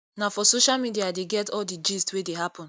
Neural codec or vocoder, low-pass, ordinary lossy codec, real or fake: none; none; none; real